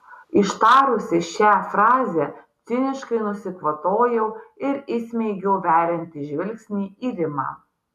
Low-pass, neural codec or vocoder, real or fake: 14.4 kHz; none; real